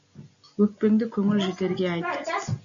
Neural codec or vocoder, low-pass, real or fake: none; 7.2 kHz; real